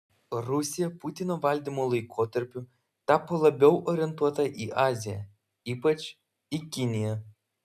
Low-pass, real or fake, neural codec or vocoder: 14.4 kHz; real; none